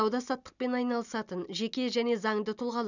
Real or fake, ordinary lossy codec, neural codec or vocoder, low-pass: real; none; none; 7.2 kHz